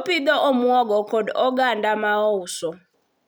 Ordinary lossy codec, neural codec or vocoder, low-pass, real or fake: none; none; none; real